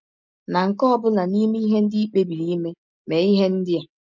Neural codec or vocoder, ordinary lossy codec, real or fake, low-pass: none; none; real; 7.2 kHz